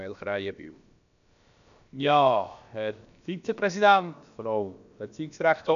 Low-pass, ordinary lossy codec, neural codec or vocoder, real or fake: 7.2 kHz; none; codec, 16 kHz, about 1 kbps, DyCAST, with the encoder's durations; fake